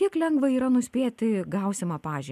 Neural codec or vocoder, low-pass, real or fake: none; 14.4 kHz; real